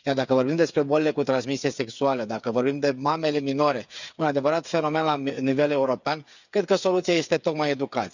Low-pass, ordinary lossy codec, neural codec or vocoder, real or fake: 7.2 kHz; none; codec, 16 kHz, 8 kbps, FreqCodec, smaller model; fake